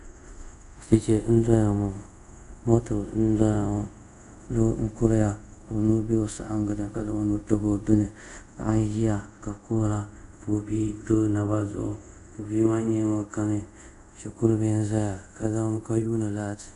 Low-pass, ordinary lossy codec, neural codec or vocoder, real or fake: 10.8 kHz; MP3, 96 kbps; codec, 24 kHz, 0.5 kbps, DualCodec; fake